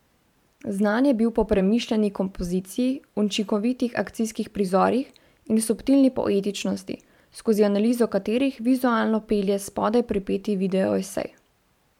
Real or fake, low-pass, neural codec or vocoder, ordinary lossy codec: fake; 19.8 kHz; vocoder, 44.1 kHz, 128 mel bands every 512 samples, BigVGAN v2; MP3, 96 kbps